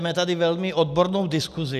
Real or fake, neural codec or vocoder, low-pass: real; none; 14.4 kHz